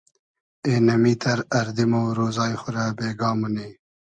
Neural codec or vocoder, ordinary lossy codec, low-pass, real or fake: none; Opus, 64 kbps; 9.9 kHz; real